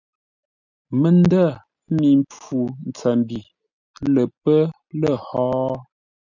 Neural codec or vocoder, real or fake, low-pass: none; real; 7.2 kHz